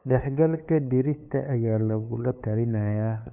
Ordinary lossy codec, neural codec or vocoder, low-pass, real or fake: none; codec, 16 kHz, 2 kbps, FunCodec, trained on LibriTTS, 25 frames a second; 3.6 kHz; fake